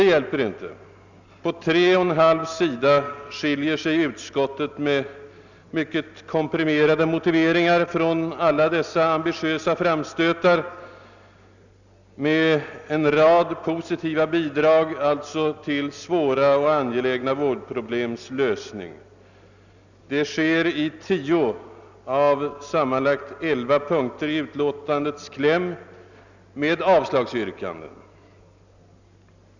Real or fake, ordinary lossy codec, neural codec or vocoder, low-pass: real; none; none; 7.2 kHz